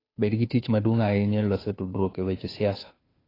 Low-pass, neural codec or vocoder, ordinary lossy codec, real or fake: 5.4 kHz; codec, 16 kHz, 2 kbps, FunCodec, trained on Chinese and English, 25 frames a second; AAC, 24 kbps; fake